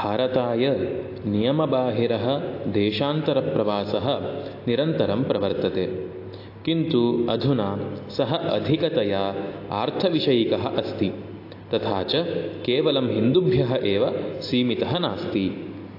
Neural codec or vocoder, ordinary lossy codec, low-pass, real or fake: none; AAC, 32 kbps; 5.4 kHz; real